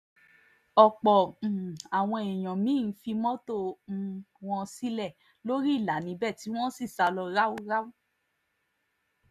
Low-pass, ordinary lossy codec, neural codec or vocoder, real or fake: 14.4 kHz; none; none; real